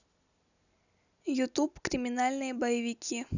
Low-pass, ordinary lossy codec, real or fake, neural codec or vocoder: 7.2 kHz; none; real; none